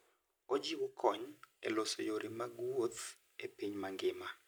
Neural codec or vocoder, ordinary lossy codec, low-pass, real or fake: none; none; none; real